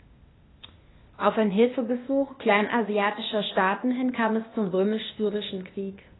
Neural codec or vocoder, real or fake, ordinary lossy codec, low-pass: codec, 16 kHz, 0.8 kbps, ZipCodec; fake; AAC, 16 kbps; 7.2 kHz